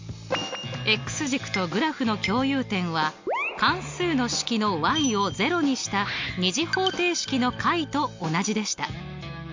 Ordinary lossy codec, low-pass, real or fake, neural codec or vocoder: MP3, 48 kbps; 7.2 kHz; real; none